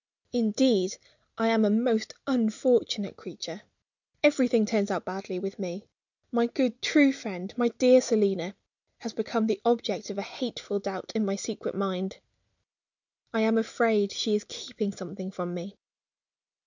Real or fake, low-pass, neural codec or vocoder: real; 7.2 kHz; none